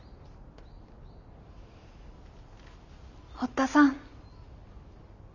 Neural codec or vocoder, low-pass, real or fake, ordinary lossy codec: none; 7.2 kHz; real; none